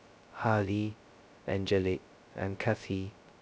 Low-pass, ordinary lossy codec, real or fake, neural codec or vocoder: none; none; fake; codec, 16 kHz, 0.2 kbps, FocalCodec